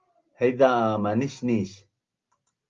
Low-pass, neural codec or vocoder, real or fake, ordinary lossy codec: 7.2 kHz; none; real; Opus, 32 kbps